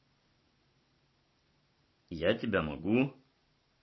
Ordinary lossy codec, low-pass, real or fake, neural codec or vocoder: MP3, 24 kbps; 7.2 kHz; real; none